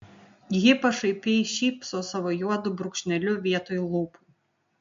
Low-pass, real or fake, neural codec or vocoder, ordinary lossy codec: 7.2 kHz; real; none; MP3, 48 kbps